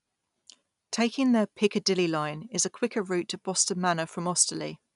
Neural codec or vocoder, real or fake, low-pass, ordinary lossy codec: none; real; 10.8 kHz; none